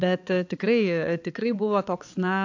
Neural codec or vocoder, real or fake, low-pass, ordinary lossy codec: codec, 16 kHz, 4 kbps, X-Codec, HuBERT features, trained on balanced general audio; fake; 7.2 kHz; AAC, 48 kbps